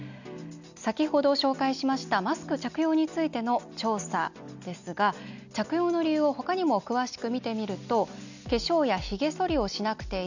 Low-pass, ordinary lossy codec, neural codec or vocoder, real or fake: 7.2 kHz; none; none; real